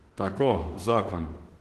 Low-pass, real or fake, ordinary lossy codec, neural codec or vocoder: 14.4 kHz; fake; Opus, 16 kbps; autoencoder, 48 kHz, 32 numbers a frame, DAC-VAE, trained on Japanese speech